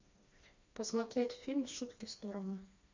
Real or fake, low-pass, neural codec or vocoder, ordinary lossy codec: fake; 7.2 kHz; codec, 16 kHz, 2 kbps, FreqCodec, smaller model; MP3, 64 kbps